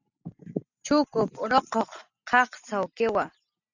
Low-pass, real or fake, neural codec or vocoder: 7.2 kHz; real; none